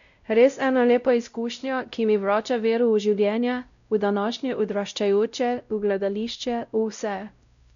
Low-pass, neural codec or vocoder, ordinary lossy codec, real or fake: 7.2 kHz; codec, 16 kHz, 0.5 kbps, X-Codec, WavLM features, trained on Multilingual LibriSpeech; MP3, 96 kbps; fake